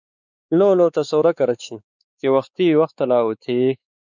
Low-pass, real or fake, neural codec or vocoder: 7.2 kHz; fake; codec, 16 kHz, 4 kbps, X-Codec, WavLM features, trained on Multilingual LibriSpeech